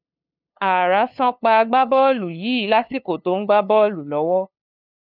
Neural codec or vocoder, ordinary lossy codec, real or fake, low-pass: codec, 16 kHz, 2 kbps, FunCodec, trained on LibriTTS, 25 frames a second; none; fake; 5.4 kHz